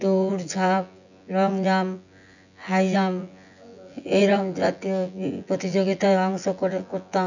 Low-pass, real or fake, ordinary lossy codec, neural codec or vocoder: 7.2 kHz; fake; none; vocoder, 24 kHz, 100 mel bands, Vocos